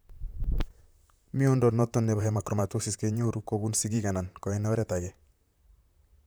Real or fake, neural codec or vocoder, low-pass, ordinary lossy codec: fake; vocoder, 44.1 kHz, 128 mel bands, Pupu-Vocoder; none; none